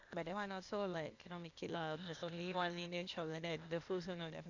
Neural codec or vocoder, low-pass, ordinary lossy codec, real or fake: codec, 16 kHz, 0.8 kbps, ZipCodec; 7.2 kHz; Opus, 64 kbps; fake